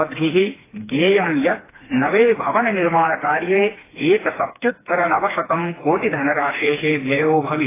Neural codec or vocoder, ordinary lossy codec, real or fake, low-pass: codec, 16 kHz, 2 kbps, FreqCodec, smaller model; AAC, 16 kbps; fake; 3.6 kHz